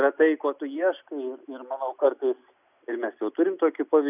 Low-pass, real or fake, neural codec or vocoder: 3.6 kHz; real; none